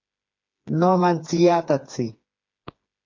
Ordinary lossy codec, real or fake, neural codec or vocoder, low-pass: MP3, 48 kbps; fake; codec, 16 kHz, 4 kbps, FreqCodec, smaller model; 7.2 kHz